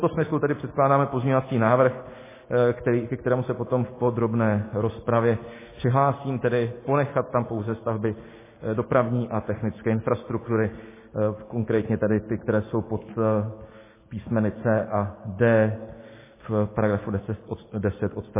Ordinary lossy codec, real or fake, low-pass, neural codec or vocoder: MP3, 16 kbps; real; 3.6 kHz; none